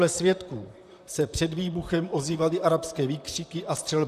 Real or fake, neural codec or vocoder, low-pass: fake; vocoder, 44.1 kHz, 128 mel bands, Pupu-Vocoder; 14.4 kHz